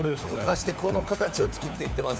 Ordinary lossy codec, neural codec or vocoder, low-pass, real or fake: none; codec, 16 kHz, 4 kbps, FunCodec, trained on LibriTTS, 50 frames a second; none; fake